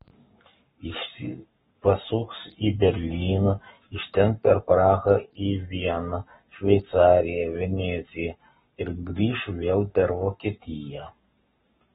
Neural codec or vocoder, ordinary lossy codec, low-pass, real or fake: codec, 44.1 kHz, 7.8 kbps, Pupu-Codec; AAC, 16 kbps; 19.8 kHz; fake